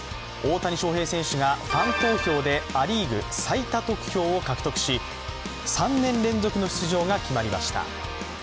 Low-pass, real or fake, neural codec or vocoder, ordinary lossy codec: none; real; none; none